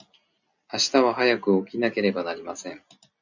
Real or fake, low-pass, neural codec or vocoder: real; 7.2 kHz; none